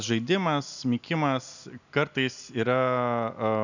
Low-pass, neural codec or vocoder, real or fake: 7.2 kHz; none; real